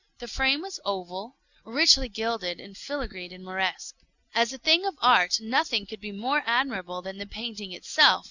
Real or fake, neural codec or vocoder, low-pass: real; none; 7.2 kHz